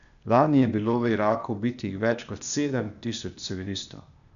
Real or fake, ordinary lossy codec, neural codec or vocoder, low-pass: fake; none; codec, 16 kHz, 0.8 kbps, ZipCodec; 7.2 kHz